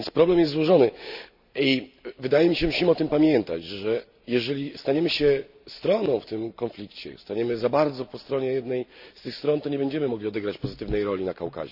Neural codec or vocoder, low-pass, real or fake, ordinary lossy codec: none; 5.4 kHz; real; none